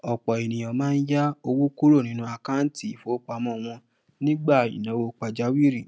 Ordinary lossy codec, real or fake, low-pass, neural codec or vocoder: none; real; none; none